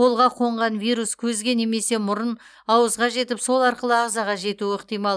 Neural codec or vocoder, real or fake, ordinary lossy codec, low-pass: none; real; none; none